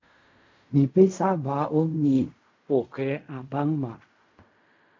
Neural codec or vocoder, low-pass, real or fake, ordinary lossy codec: codec, 16 kHz in and 24 kHz out, 0.4 kbps, LongCat-Audio-Codec, fine tuned four codebook decoder; 7.2 kHz; fake; MP3, 48 kbps